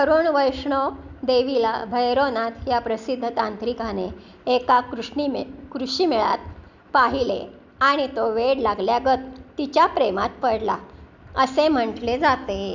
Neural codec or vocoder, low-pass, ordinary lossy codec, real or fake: none; 7.2 kHz; none; real